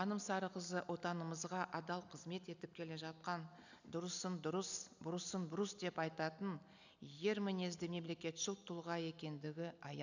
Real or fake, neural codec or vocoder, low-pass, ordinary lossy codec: real; none; 7.2 kHz; none